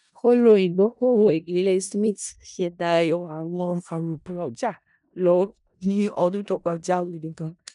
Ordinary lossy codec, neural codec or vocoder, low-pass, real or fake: none; codec, 16 kHz in and 24 kHz out, 0.4 kbps, LongCat-Audio-Codec, four codebook decoder; 10.8 kHz; fake